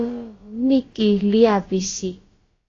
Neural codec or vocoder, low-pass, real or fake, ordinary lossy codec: codec, 16 kHz, about 1 kbps, DyCAST, with the encoder's durations; 7.2 kHz; fake; AAC, 32 kbps